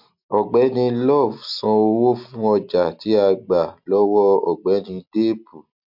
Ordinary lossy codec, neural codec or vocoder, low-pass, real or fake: MP3, 48 kbps; none; 5.4 kHz; real